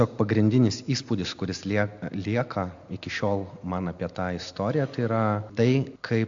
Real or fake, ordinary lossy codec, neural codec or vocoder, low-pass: real; AAC, 64 kbps; none; 7.2 kHz